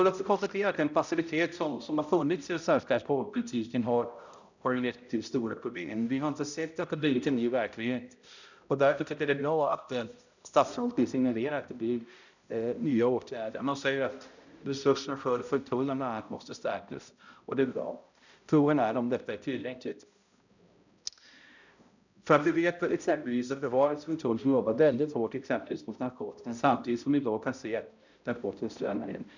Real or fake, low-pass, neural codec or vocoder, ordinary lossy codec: fake; 7.2 kHz; codec, 16 kHz, 0.5 kbps, X-Codec, HuBERT features, trained on balanced general audio; none